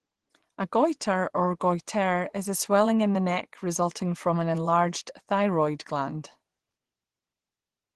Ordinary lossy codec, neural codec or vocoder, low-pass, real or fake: Opus, 16 kbps; vocoder, 24 kHz, 100 mel bands, Vocos; 10.8 kHz; fake